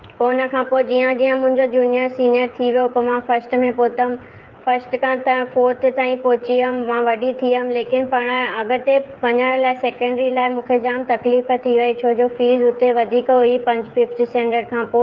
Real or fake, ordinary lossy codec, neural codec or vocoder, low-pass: fake; Opus, 32 kbps; codec, 16 kHz, 8 kbps, FreqCodec, smaller model; 7.2 kHz